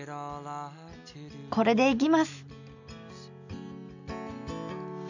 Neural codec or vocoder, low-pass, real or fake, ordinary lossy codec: none; 7.2 kHz; real; none